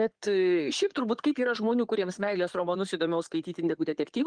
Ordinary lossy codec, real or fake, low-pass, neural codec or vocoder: Opus, 24 kbps; fake; 9.9 kHz; codec, 16 kHz in and 24 kHz out, 2.2 kbps, FireRedTTS-2 codec